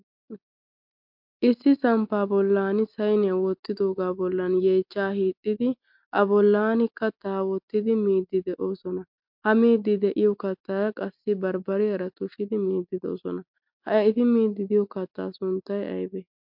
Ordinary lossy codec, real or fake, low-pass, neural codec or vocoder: MP3, 48 kbps; real; 5.4 kHz; none